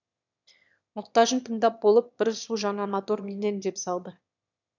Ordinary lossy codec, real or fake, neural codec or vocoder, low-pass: none; fake; autoencoder, 22.05 kHz, a latent of 192 numbers a frame, VITS, trained on one speaker; 7.2 kHz